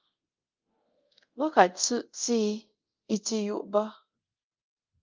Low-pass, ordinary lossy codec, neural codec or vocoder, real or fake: 7.2 kHz; Opus, 32 kbps; codec, 24 kHz, 0.5 kbps, DualCodec; fake